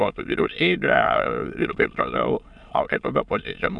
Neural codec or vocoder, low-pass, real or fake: autoencoder, 22.05 kHz, a latent of 192 numbers a frame, VITS, trained on many speakers; 9.9 kHz; fake